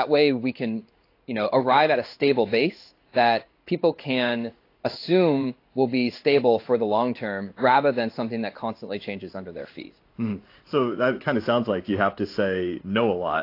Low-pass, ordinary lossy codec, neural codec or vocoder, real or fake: 5.4 kHz; AAC, 32 kbps; codec, 16 kHz in and 24 kHz out, 1 kbps, XY-Tokenizer; fake